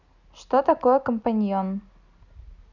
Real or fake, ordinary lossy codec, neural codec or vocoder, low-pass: real; none; none; 7.2 kHz